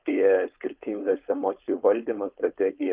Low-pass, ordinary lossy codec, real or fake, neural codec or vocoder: 3.6 kHz; Opus, 32 kbps; fake; codec, 16 kHz, 4.8 kbps, FACodec